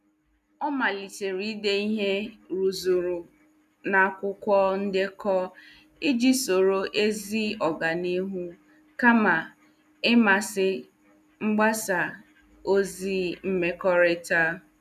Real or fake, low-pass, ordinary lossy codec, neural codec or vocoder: real; 14.4 kHz; none; none